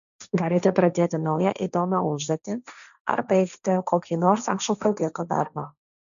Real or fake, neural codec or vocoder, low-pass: fake; codec, 16 kHz, 1.1 kbps, Voila-Tokenizer; 7.2 kHz